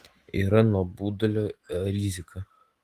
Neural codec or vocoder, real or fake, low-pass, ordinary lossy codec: autoencoder, 48 kHz, 128 numbers a frame, DAC-VAE, trained on Japanese speech; fake; 14.4 kHz; Opus, 24 kbps